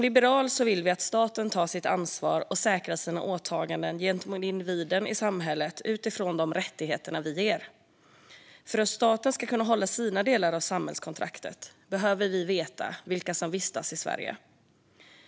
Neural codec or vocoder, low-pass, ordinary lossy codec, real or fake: none; none; none; real